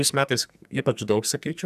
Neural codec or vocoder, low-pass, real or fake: codec, 44.1 kHz, 2.6 kbps, SNAC; 14.4 kHz; fake